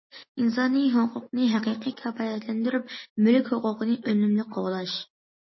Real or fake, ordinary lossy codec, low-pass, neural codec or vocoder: real; MP3, 24 kbps; 7.2 kHz; none